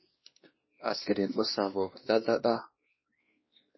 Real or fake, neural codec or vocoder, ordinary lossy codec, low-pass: fake; codec, 16 kHz, 1 kbps, X-Codec, WavLM features, trained on Multilingual LibriSpeech; MP3, 24 kbps; 7.2 kHz